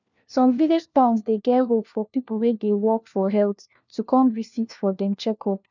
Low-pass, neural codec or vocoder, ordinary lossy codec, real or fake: 7.2 kHz; codec, 16 kHz, 1 kbps, FunCodec, trained on LibriTTS, 50 frames a second; none; fake